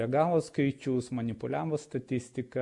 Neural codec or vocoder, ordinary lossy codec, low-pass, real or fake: none; MP3, 64 kbps; 10.8 kHz; real